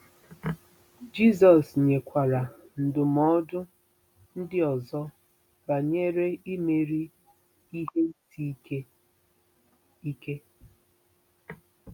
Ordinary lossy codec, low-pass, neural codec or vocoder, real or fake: none; 19.8 kHz; none; real